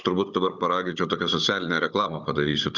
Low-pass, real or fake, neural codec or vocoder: 7.2 kHz; fake; codec, 16 kHz, 16 kbps, FunCodec, trained on Chinese and English, 50 frames a second